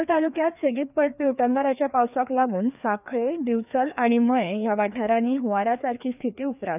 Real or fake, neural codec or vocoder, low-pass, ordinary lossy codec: fake; codec, 16 kHz, 2 kbps, FreqCodec, larger model; 3.6 kHz; none